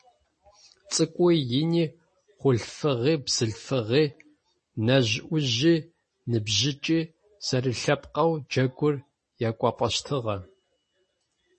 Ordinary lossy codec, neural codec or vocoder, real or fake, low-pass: MP3, 32 kbps; none; real; 10.8 kHz